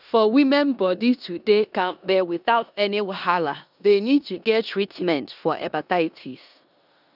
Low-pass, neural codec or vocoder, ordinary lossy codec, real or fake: 5.4 kHz; codec, 16 kHz in and 24 kHz out, 0.9 kbps, LongCat-Audio-Codec, four codebook decoder; none; fake